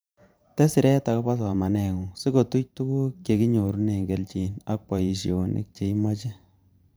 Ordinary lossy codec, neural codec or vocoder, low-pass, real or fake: none; vocoder, 44.1 kHz, 128 mel bands every 512 samples, BigVGAN v2; none; fake